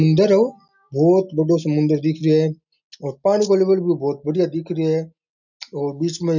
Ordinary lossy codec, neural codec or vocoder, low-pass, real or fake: none; none; 7.2 kHz; real